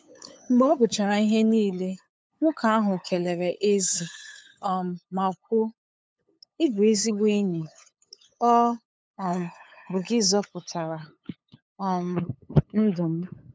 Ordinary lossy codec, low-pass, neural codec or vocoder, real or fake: none; none; codec, 16 kHz, 8 kbps, FunCodec, trained on LibriTTS, 25 frames a second; fake